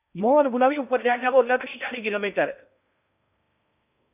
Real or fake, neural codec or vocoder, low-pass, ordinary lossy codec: fake; codec, 16 kHz in and 24 kHz out, 0.6 kbps, FocalCodec, streaming, 4096 codes; 3.6 kHz; none